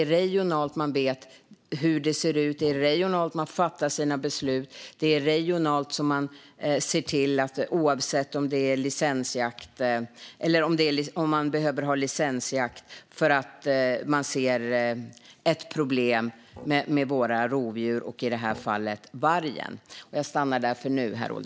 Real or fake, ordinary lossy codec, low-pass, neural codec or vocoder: real; none; none; none